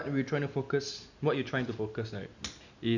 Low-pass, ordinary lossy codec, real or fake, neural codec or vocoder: 7.2 kHz; none; real; none